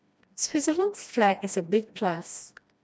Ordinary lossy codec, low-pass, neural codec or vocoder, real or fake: none; none; codec, 16 kHz, 1 kbps, FreqCodec, smaller model; fake